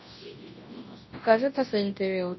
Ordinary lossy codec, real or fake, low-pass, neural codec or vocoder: MP3, 24 kbps; fake; 7.2 kHz; codec, 24 kHz, 0.9 kbps, WavTokenizer, large speech release